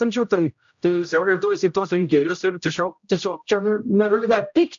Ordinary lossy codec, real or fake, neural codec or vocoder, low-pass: AAC, 64 kbps; fake; codec, 16 kHz, 0.5 kbps, X-Codec, HuBERT features, trained on general audio; 7.2 kHz